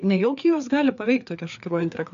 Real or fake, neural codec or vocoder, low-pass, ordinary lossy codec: fake; codec, 16 kHz, 4 kbps, FreqCodec, larger model; 7.2 kHz; AAC, 96 kbps